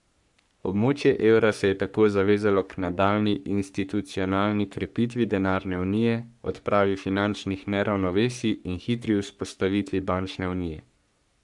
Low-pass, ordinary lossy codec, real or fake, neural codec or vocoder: 10.8 kHz; none; fake; codec, 44.1 kHz, 3.4 kbps, Pupu-Codec